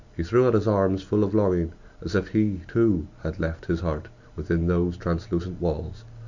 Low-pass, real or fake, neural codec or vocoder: 7.2 kHz; real; none